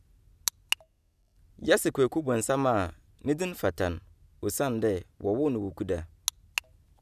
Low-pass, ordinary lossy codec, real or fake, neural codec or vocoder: 14.4 kHz; none; real; none